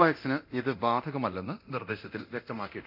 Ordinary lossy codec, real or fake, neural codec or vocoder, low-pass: none; fake; codec, 24 kHz, 0.9 kbps, DualCodec; 5.4 kHz